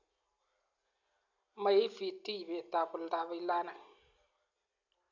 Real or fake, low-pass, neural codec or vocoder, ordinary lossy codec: real; 7.2 kHz; none; none